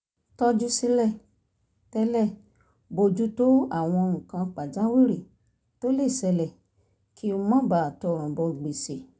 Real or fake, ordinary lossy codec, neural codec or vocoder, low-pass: real; none; none; none